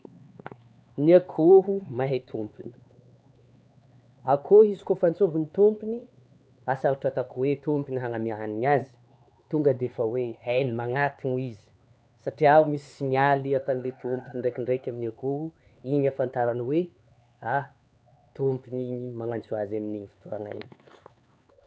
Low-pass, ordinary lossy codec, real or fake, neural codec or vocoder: none; none; fake; codec, 16 kHz, 4 kbps, X-Codec, HuBERT features, trained on LibriSpeech